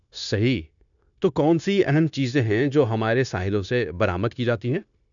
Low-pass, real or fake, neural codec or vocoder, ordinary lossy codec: 7.2 kHz; fake; codec, 16 kHz, 0.9 kbps, LongCat-Audio-Codec; none